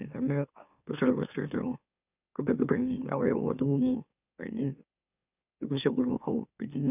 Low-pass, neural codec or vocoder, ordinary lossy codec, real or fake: 3.6 kHz; autoencoder, 44.1 kHz, a latent of 192 numbers a frame, MeloTTS; none; fake